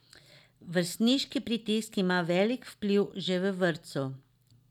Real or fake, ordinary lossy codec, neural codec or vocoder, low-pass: real; none; none; 19.8 kHz